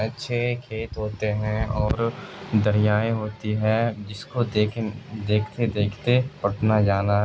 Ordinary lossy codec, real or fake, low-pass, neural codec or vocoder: none; real; none; none